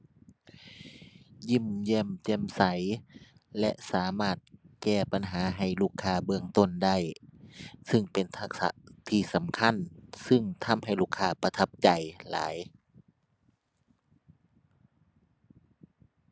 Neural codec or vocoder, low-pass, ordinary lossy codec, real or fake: none; none; none; real